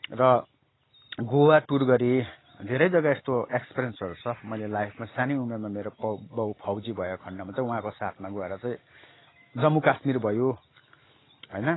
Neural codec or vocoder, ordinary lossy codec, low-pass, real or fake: none; AAC, 16 kbps; 7.2 kHz; real